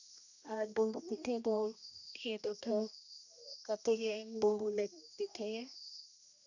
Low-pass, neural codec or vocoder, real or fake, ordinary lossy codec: 7.2 kHz; codec, 16 kHz, 1 kbps, X-Codec, HuBERT features, trained on general audio; fake; none